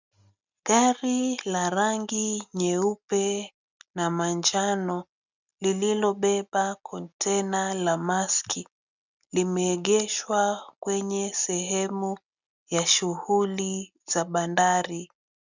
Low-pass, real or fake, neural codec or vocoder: 7.2 kHz; real; none